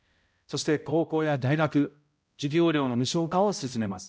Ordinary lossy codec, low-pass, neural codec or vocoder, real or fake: none; none; codec, 16 kHz, 0.5 kbps, X-Codec, HuBERT features, trained on balanced general audio; fake